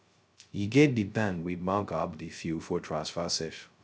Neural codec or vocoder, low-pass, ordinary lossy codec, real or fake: codec, 16 kHz, 0.2 kbps, FocalCodec; none; none; fake